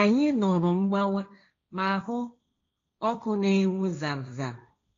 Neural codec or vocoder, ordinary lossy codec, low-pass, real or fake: codec, 16 kHz, 1.1 kbps, Voila-Tokenizer; AAC, 48 kbps; 7.2 kHz; fake